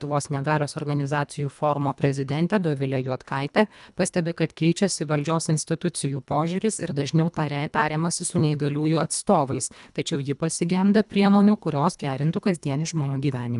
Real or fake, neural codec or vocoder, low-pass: fake; codec, 24 kHz, 1.5 kbps, HILCodec; 10.8 kHz